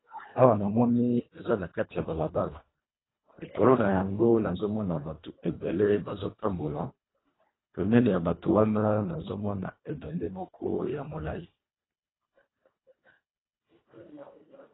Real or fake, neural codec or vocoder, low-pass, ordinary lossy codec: fake; codec, 24 kHz, 1.5 kbps, HILCodec; 7.2 kHz; AAC, 16 kbps